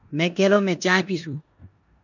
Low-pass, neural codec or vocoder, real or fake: 7.2 kHz; codec, 16 kHz in and 24 kHz out, 0.9 kbps, LongCat-Audio-Codec, four codebook decoder; fake